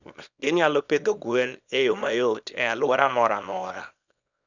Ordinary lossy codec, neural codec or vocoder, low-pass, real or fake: none; codec, 24 kHz, 0.9 kbps, WavTokenizer, small release; 7.2 kHz; fake